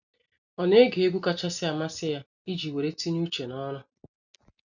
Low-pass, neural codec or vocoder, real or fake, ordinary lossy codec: 7.2 kHz; none; real; none